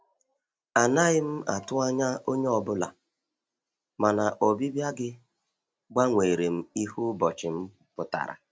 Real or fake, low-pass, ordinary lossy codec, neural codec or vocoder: real; none; none; none